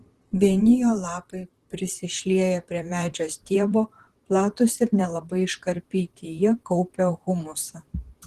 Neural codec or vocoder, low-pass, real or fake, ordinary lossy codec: vocoder, 44.1 kHz, 128 mel bands, Pupu-Vocoder; 14.4 kHz; fake; Opus, 16 kbps